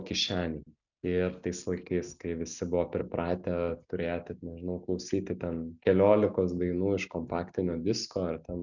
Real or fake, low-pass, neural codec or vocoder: real; 7.2 kHz; none